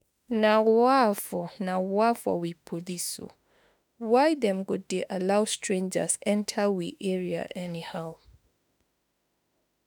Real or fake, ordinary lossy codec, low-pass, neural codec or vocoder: fake; none; none; autoencoder, 48 kHz, 32 numbers a frame, DAC-VAE, trained on Japanese speech